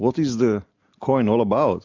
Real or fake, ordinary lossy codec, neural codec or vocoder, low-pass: real; MP3, 64 kbps; none; 7.2 kHz